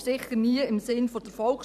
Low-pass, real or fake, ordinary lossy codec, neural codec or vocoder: 14.4 kHz; fake; none; vocoder, 44.1 kHz, 128 mel bands every 512 samples, BigVGAN v2